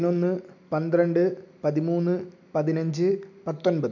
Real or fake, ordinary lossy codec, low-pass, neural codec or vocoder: real; none; 7.2 kHz; none